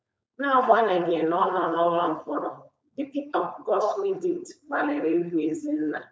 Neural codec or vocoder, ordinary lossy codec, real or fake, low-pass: codec, 16 kHz, 4.8 kbps, FACodec; none; fake; none